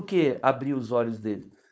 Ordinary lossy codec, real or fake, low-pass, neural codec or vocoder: none; fake; none; codec, 16 kHz, 4.8 kbps, FACodec